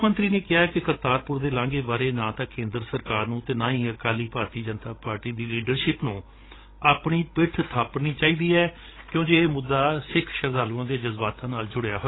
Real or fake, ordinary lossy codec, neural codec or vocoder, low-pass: fake; AAC, 16 kbps; codec, 16 kHz, 16 kbps, FreqCodec, larger model; 7.2 kHz